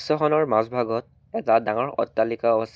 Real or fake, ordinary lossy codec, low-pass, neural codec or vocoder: real; none; none; none